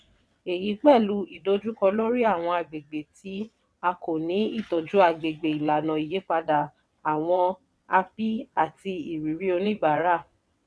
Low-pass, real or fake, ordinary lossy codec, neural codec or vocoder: none; fake; none; vocoder, 22.05 kHz, 80 mel bands, WaveNeXt